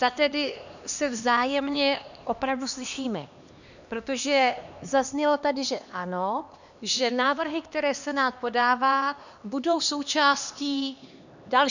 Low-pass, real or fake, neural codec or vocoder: 7.2 kHz; fake; codec, 16 kHz, 2 kbps, X-Codec, HuBERT features, trained on LibriSpeech